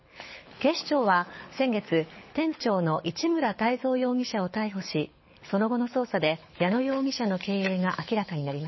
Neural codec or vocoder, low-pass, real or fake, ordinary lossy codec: codec, 24 kHz, 6 kbps, HILCodec; 7.2 kHz; fake; MP3, 24 kbps